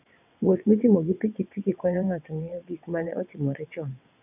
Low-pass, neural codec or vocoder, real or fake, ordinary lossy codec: 3.6 kHz; vocoder, 22.05 kHz, 80 mel bands, WaveNeXt; fake; none